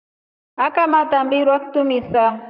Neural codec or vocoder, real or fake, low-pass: vocoder, 44.1 kHz, 128 mel bands, Pupu-Vocoder; fake; 5.4 kHz